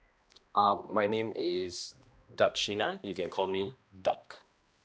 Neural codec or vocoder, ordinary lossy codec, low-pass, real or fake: codec, 16 kHz, 1 kbps, X-Codec, HuBERT features, trained on balanced general audio; none; none; fake